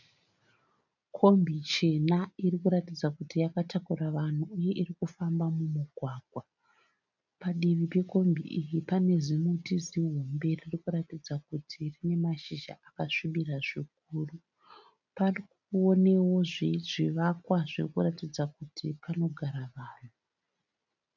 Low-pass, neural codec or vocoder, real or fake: 7.2 kHz; none; real